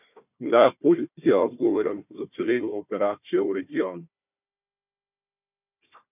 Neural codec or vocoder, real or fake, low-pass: codec, 16 kHz, 1 kbps, FunCodec, trained on Chinese and English, 50 frames a second; fake; 3.6 kHz